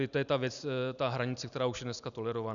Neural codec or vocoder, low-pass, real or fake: none; 7.2 kHz; real